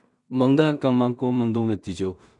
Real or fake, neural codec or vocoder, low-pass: fake; codec, 16 kHz in and 24 kHz out, 0.4 kbps, LongCat-Audio-Codec, two codebook decoder; 10.8 kHz